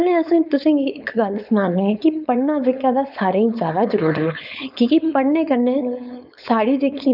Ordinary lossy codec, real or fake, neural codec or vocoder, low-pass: none; fake; codec, 16 kHz, 4.8 kbps, FACodec; 5.4 kHz